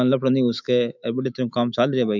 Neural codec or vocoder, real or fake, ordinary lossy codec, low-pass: autoencoder, 48 kHz, 128 numbers a frame, DAC-VAE, trained on Japanese speech; fake; none; 7.2 kHz